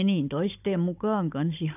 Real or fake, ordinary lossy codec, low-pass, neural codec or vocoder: fake; AAC, 32 kbps; 3.6 kHz; codec, 16 kHz, 16 kbps, FunCodec, trained on Chinese and English, 50 frames a second